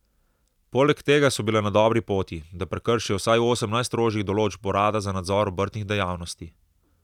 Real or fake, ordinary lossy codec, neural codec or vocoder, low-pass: real; none; none; 19.8 kHz